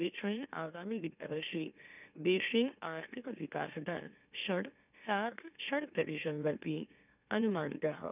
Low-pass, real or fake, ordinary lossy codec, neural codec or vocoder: 3.6 kHz; fake; none; autoencoder, 44.1 kHz, a latent of 192 numbers a frame, MeloTTS